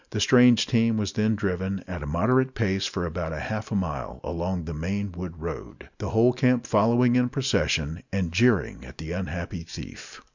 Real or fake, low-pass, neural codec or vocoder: real; 7.2 kHz; none